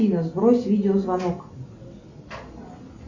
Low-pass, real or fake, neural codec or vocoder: 7.2 kHz; real; none